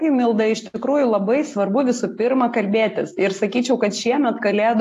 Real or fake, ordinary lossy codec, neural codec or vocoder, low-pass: real; AAC, 64 kbps; none; 14.4 kHz